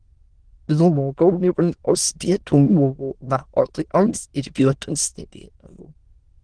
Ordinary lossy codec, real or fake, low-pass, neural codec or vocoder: Opus, 16 kbps; fake; 9.9 kHz; autoencoder, 22.05 kHz, a latent of 192 numbers a frame, VITS, trained on many speakers